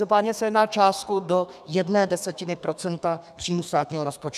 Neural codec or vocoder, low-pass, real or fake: codec, 32 kHz, 1.9 kbps, SNAC; 14.4 kHz; fake